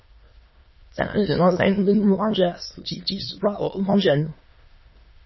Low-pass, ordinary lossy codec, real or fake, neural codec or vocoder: 7.2 kHz; MP3, 24 kbps; fake; autoencoder, 22.05 kHz, a latent of 192 numbers a frame, VITS, trained on many speakers